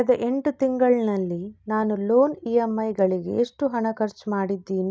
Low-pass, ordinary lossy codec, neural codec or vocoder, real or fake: none; none; none; real